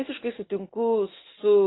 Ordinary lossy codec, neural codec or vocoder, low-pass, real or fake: AAC, 16 kbps; none; 7.2 kHz; real